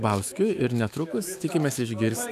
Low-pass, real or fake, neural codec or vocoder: 14.4 kHz; fake; autoencoder, 48 kHz, 128 numbers a frame, DAC-VAE, trained on Japanese speech